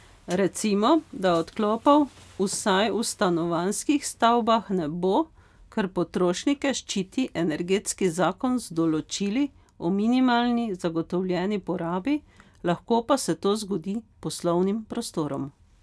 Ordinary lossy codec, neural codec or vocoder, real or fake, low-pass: none; none; real; none